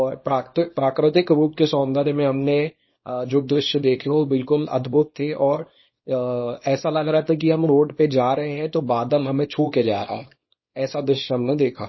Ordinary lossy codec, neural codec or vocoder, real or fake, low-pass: MP3, 24 kbps; codec, 24 kHz, 0.9 kbps, WavTokenizer, small release; fake; 7.2 kHz